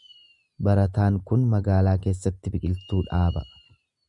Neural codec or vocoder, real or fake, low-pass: none; real; 10.8 kHz